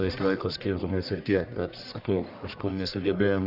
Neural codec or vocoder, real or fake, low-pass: codec, 44.1 kHz, 1.7 kbps, Pupu-Codec; fake; 5.4 kHz